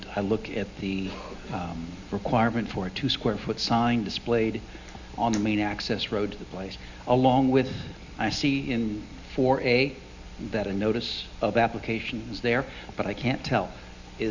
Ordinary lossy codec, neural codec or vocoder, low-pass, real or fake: Opus, 64 kbps; none; 7.2 kHz; real